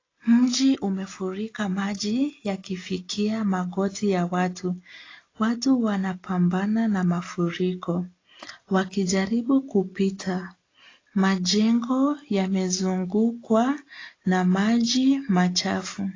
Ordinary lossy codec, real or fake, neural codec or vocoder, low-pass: AAC, 32 kbps; real; none; 7.2 kHz